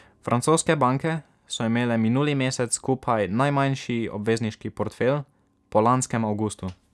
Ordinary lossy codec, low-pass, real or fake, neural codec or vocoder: none; none; real; none